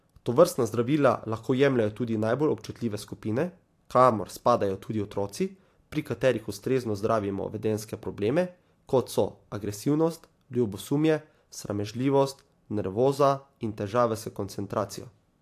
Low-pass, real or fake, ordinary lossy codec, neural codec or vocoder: 14.4 kHz; fake; AAC, 64 kbps; vocoder, 44.1 kHz, 128 mel bands every 512 samples, BigVGAN v2